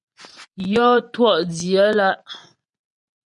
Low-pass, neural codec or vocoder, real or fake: 10.8 kHz; vocoder, 24 kHz, 100 mel bands, Vocos; fake